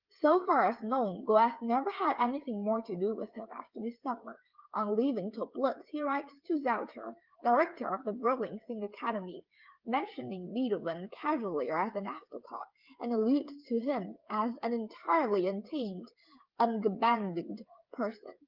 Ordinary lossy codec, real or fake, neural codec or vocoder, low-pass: Opus, 32 kbps; fake; codec, 16 kHz, 8 kbps, FreqCodec, smaller model; 5.4 kHz